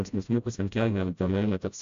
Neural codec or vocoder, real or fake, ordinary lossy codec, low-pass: codec, 16 kHz, 0.5 kbps, FreqCodec, smaller model; fake; AAC, 64 kbps; 7.2 kHz